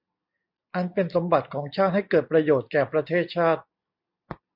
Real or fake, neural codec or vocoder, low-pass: real; none; 5.4 kHz